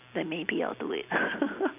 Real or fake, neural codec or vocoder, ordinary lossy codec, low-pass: real; none; none; 3.6 kHz